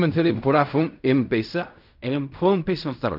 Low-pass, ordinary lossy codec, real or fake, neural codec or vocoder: 5.4 kHz; none; fake; codec, 16 kHz in and 24 kHz out, 0.4 kbps, LongCat-Audio-Codec, fine tuned four codebook decoder